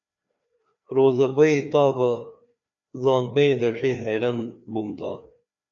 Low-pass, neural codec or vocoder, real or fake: 7.2 kHz; codec, 16 kHz, 2 kbps, FreqCodec, larger model; fake